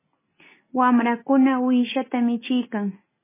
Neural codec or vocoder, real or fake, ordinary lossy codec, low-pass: none; real; MP3, 16 kbps; 3.6 kHz